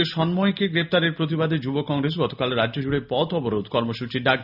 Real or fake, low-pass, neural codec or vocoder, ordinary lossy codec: real; 5.4 kHz; none; none